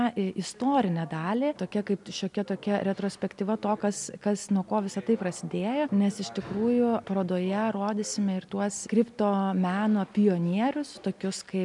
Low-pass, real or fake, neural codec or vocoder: 10.8 kHz; real; none